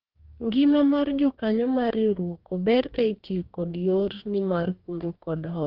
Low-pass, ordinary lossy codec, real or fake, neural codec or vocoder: 5.4 kHz; Opus, 24 kbps; fake; codec, 44.1 kHz, 2.6 kbps, DAC